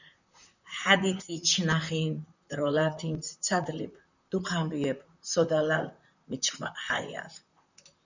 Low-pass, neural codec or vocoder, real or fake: 7.2 kHz; vocoder, 44.1 kHz, 128 mel bands, Pupu-Vocoder; fake